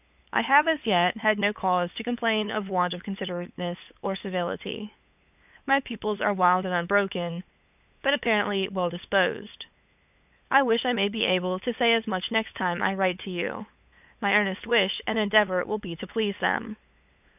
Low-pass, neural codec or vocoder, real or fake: 3.6 kHz; codec, 16 kHz, 8 kbps, FunCodec, trained on LibriTTS, 25 frames a second; fake